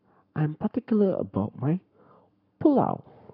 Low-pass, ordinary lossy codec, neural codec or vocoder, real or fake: 5.4 kHz; none; codec, 44.1 kHz, 7.8 kbps, Pupu-Codec; fake